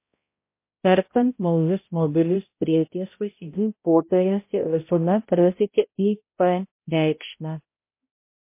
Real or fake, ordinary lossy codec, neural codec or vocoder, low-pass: fake; MP3, 24 kbps; codec, 16 kHz, 0.5 kbps, X-Codec, HuBERT features, trained on balanced general audio; 3.6 kHz